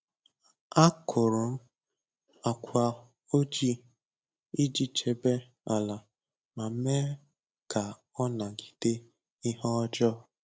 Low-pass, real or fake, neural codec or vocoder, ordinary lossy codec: none; real; none; none